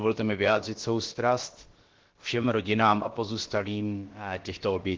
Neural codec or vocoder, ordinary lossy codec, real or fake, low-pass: codec, 16 kHz, about 1 kbps, DyCAST, with the encoder's durations; Opus, 16 kbps; fake; 7.2 kHz